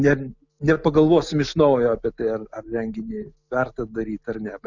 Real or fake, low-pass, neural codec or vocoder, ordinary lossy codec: real; 7.2 kHz; none; Opus, 64 kbps